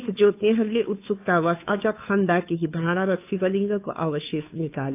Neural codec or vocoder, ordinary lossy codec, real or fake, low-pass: codec, 16 kHz, 2 kbps, FunCodec, trained on Chinese and English, 25 frames a second; AAC, 32 kbps; fake; 3.6 kHz